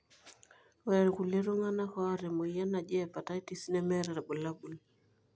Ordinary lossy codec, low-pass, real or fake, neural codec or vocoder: none; none; real; none